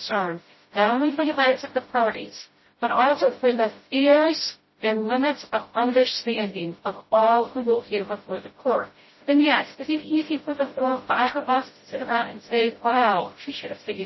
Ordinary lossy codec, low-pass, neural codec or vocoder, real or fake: MP3, 24 kbps; 7.2 kHz; codec, 16 kHz, 0.5 kbps, FreqCodec, smaller model; fake